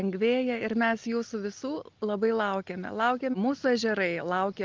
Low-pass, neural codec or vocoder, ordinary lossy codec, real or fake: 7.2 kHz; none; Opus, 32 kbps; real